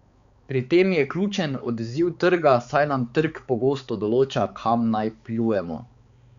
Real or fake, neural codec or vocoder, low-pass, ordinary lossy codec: fake; codec, 16 kHz, 4 kbps, X-Codec, HuBERT features, trained on balanced general audio; 7.2 kHz; Opus, 64 kbps